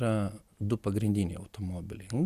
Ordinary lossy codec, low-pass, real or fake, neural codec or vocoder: Opus, 64 kbps; 14.4 kHz; real; none